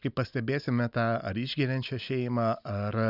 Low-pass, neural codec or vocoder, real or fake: 5.4 kHz; none; real